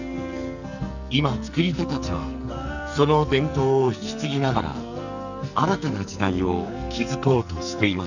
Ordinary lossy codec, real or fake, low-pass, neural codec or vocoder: none; fake; 7.2 kHz; codec, 44.1 kHz, 2.6 kbps, SNAC